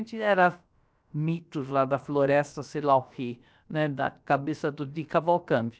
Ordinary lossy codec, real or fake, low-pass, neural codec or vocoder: none; fake; none; codec, 16 kHz, about 1 kbps, DyCAST, with the encoder's durations